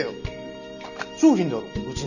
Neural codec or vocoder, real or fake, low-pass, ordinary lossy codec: none; real; 7.2 kHz; none